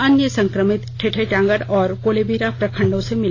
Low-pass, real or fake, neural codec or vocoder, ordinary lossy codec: 7.2 kHz; real; none; AAC, 32 kbps